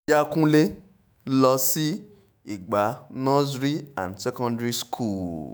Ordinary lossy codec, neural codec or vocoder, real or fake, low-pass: none; autoencoder, 48 kHz, 128 numbers a frame, DAC-VAE, trained on Japanese speech; fake; none